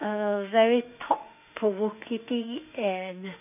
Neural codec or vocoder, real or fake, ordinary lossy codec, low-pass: autoencoder, 48 kHz, 32 numbers a frame, DAC-VAE, trained on Japanese speech; fake; none; 3.6 kHz